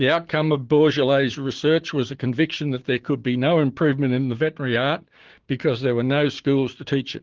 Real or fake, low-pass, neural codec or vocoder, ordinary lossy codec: fake; 7.2 kHz; codec, 16 kHz, 6 kbps, DAC; Opus, 16 kbps